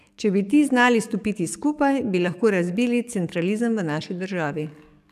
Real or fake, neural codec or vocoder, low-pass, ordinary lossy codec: fake; codec, 44.1 kHz, 7.8 kbps, DAC; 14.4 kHz; none